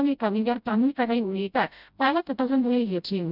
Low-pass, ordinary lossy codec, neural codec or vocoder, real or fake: 5.4 kHz; none; codec, 16 kHz, 0.5 kbps, FreqCodec, smaller model; fake